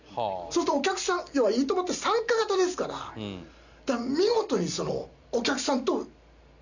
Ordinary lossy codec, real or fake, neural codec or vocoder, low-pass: none; real; none; 7.2 kHz